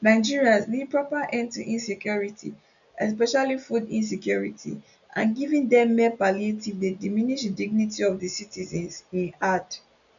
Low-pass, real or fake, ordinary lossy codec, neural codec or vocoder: 7.2 kHz; real; none; none